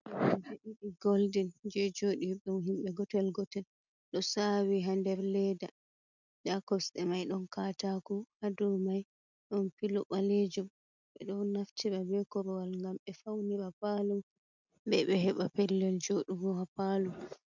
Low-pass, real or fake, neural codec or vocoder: 7.2 kHz; real; none